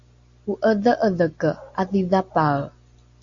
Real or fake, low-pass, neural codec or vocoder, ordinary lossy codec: real; 7.2 kHz; none; Opus, 64 kbps